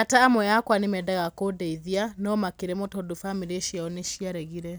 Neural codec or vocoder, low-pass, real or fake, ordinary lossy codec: none; none; real; none